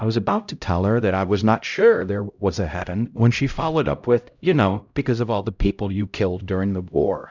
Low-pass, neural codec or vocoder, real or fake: 7.2 kHz; codec, 16 kHz, 0.5 kbps, X-Codec, HuBERT features, trained on LibriSpeech; fake